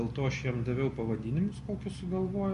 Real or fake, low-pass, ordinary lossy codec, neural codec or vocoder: real; 14.4 kHz; MP3, 48 kbps; none